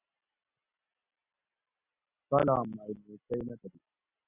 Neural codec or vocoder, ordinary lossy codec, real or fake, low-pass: none; Opus, 64 kbps; real; 3.6 kHz